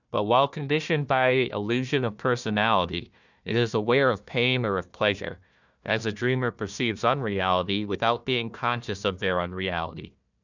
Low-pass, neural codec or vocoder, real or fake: 7.2 kHz; codec, 16 kHz, 1 kbps, FunCodec, trained on Chinese and English, 50 frames a second; fake